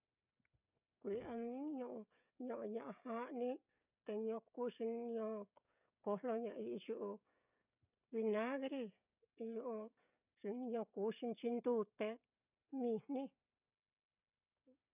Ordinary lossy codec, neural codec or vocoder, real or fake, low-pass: none; codec, 16 kHz, 16 kbps, FreqCodec, smaller model; fake; 3.6 kHz